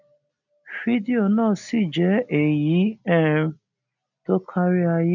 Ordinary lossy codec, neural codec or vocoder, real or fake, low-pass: none; none; real; 7.2 kHz